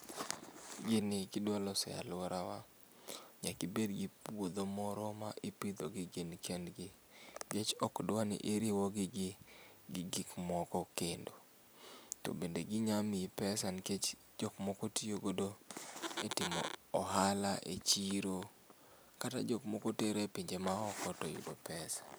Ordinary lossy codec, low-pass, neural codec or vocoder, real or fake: none; none; none; real